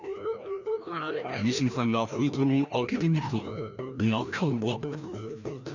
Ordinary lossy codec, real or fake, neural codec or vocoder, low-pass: none; fake; codec, 16 kHz, 1 kbps, FreqCodec, larger model; 7.2 kHz